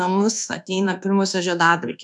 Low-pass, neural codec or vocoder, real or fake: 10.8 kHz; codec, 24 kHz, 1.2 kbps, DualCodec; fake